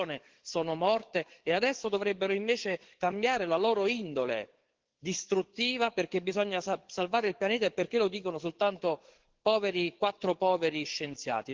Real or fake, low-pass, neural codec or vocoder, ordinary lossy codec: fake; 7.2 kHz; codec, 44.1 kHz, 7.8 kbps, DAC; Opus, 16 kbps